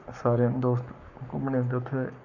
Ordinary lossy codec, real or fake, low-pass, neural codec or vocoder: none; fake; 7.2 kHz; codec, 44.1 kHz, 7.8 kbps, Pupu-Codec